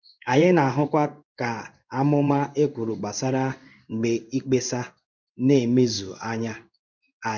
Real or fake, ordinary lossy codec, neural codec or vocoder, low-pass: fake; none; codec, 16 kHz in and 24 kHz out, 1 kbps, XY-Tokenizer; 7.2 kHz